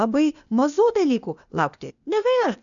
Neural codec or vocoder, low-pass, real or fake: codec, 16 kHz, 0.8 kbps, ZipCodec; 7.2 kHz; fake